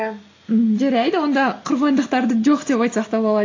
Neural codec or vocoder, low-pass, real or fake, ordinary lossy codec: none; 7.2 kHz; real; AAC, 32 kbps